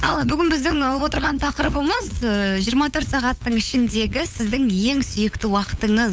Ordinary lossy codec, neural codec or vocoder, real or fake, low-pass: none; codec, 16 kHz, 8 kbps, FunCodec, trained on LibriTTS, 25 frames a second; fake; none